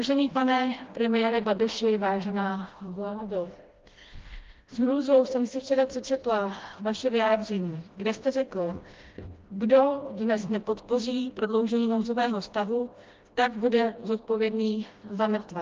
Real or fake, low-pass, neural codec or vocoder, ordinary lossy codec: fake; 7.2 kHz; codec, 16 kHz, 1 kbps, FreqCodec, smaller model; Opus, 24 kbps